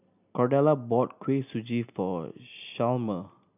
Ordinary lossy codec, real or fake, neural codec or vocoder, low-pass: none; real; none; 3.6 kHz